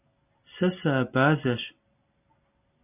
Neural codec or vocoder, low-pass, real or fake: none; 3.6 kHz; real